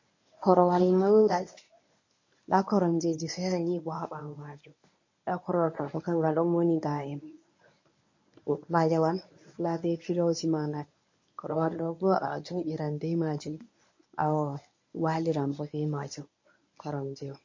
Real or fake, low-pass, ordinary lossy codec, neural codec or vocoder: fake; 7.2 kHz; MP3, 32 kbps; codec, 24 kHz, 0.9 kbps, WavTokenizer, medium speech release version 1